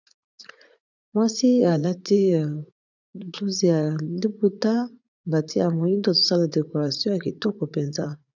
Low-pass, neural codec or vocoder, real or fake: 7.2 kHz; vocoder, 44.1 kHz, 80 mel bands, Vocos; fake